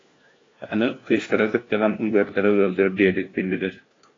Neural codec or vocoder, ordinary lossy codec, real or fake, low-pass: codec, 16 kHz, 1 kbps, FunCodec, trained on LibriTTS, 50 frames a second; AAC, 32 kbps; fake; 7.2 kHz